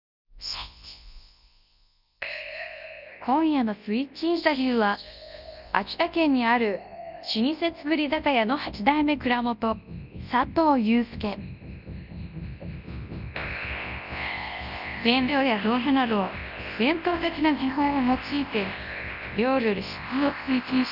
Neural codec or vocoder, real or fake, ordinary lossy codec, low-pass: codec, 24 kHz, 0.9 kbps, WavTokenizer, large speech release; fake; none; 5.4 kHz